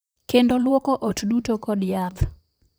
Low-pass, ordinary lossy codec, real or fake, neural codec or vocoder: none; none; fake; vocoder, 44.1 kHz, 128 mel bands, Pupu-Vocoder